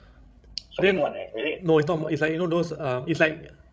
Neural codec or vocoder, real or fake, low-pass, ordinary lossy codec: codec, 16 kHz, 16 kbps, FreqCodec, larger model; fake; none; none